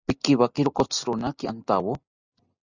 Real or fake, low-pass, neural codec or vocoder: real; 7.2 kHz; none